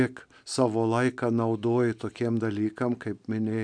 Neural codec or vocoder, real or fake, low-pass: none; real; 9.9 kHz